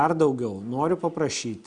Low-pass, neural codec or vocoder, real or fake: 9.9 kHz; none; real